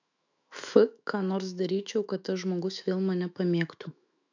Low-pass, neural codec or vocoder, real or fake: 7.2 kHz; autoencoder, 48 kHz, 128 numbers a frame, DAC-VAE, trained on Japanese speech; fake